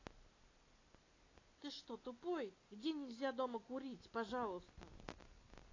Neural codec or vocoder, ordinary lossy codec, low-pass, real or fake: none; AAC, 32 kbps; 7.2 kHz; real